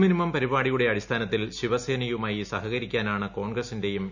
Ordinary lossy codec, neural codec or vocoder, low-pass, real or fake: none; none; 7.2 kHz; real